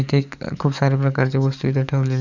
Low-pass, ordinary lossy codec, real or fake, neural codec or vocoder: 7.2 kHz; none; fake; codec, 44.1 kHz, 7.8 kbps, DAC